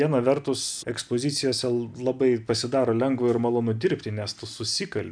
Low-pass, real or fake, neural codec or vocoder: 9.9 kHz; real; none